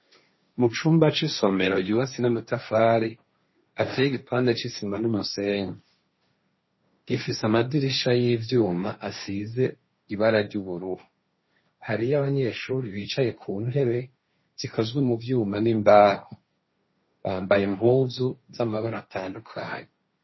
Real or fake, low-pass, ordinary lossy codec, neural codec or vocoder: fake; 7.2 kHz; MP3, 24 kbps; codec, 16 kHz, 1.1 kbps, Voila-Tokenizer